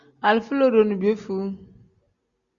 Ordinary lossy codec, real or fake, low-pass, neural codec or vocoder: Opus, 64 kbps; real; 7.2 kHz; none